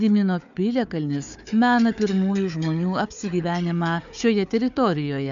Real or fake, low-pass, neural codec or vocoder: fake; 7.2 kHz; codec, 16 kHz, 4 kbps, FunCodec, trained on Chinese and English, 50 frames a second